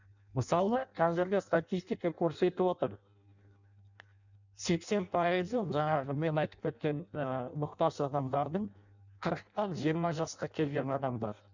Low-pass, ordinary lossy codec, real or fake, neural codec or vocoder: 7.2 kHz; none; fake; codec, 16 kHz in and 24 kHz out, 0.6 kbps, FireRedTTS-2 codec